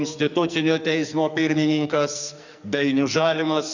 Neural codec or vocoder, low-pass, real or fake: codec, 44.1 kHz, 2.6 kbps, SNAC; 7.2 kHz; fake